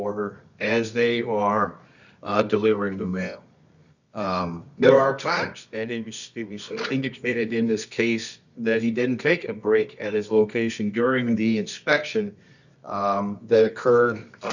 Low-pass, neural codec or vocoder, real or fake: 7.2 kHz; codec, 24 kHz, 0.9 kbps, WavTokenizer, medium music audio release; fake